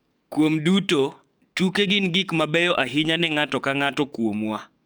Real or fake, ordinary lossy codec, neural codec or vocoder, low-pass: fake; none; codec, 44.1 kHz, 7.8 kbps, DAC; none